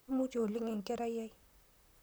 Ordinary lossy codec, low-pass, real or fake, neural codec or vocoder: none; none; fake; vocoder, 44.1 kHz, 128 mel bands, Pupu-Vocoder